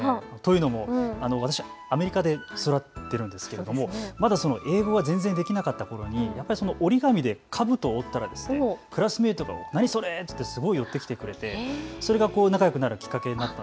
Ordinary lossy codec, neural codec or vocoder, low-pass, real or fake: none; none; none; real